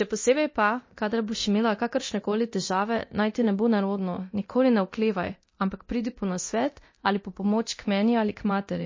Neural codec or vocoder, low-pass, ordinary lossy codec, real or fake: codec, 24 kHz, 0.9 kbps, DualCodec; 7.2 kHz; MP3, 32 kbps; fake